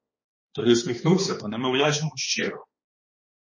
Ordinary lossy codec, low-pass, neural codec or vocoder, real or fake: MP3, 32 kbps; 7.2 kHz; codec, 16 kHz, 2 kbps, X-Codec, HuBERT features, trained on balanced general audio; fake